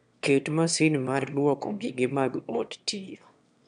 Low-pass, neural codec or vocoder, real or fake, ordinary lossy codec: 9.9 kHz; autoencoder, 22.05 kHz, a latent of 192 numbers a frame, VITS, trained on one speaker; fake; none